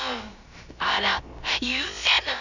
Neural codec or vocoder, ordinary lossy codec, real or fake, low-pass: codec, 16 kHz, about 1 kbps, DyCAST, with the encoder's durations; none; fake; 7.2 kHz